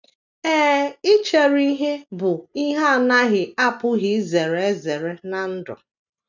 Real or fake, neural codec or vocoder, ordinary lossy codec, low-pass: real; none; none; 7.2 kHz